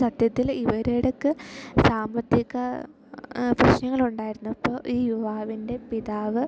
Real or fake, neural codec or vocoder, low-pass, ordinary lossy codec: real; none; none; none